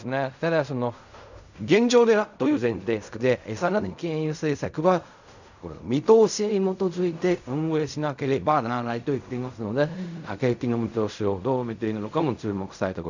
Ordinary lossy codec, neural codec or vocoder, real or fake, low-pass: none; codec, 16 kHz in and 24 kHz out, 0.4 kbps, LongCat-Audio-Codec, fine tuned four codebook decoder; fake; 7.2 kHz